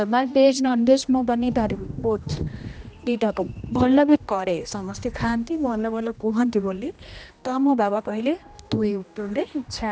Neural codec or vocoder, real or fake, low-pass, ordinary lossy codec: codec, 16 kHz, 1 kbps, X-Codec, HuBERT features, trained on general audio; fake; none; none